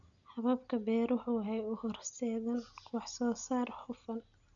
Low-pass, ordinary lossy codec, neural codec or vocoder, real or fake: 7.2 kHz; none; none; real